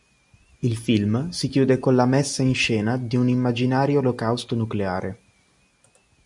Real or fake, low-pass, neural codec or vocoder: real; 10.8 kHz; none